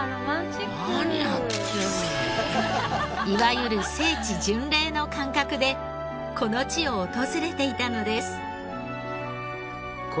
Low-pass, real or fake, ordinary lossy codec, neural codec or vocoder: none; real; none; none